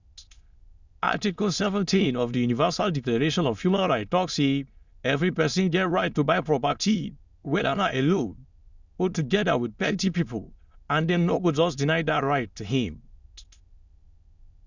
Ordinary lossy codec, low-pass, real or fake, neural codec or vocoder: Opus, 64 kbps; 7.2 kHz; fake; autoencoder, 22.05 kHz, a latent of 192 numbers a frame, VITS, trained on many speakers